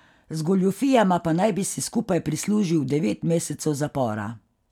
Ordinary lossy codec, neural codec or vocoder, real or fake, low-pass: none; none; real; 19.8 kHz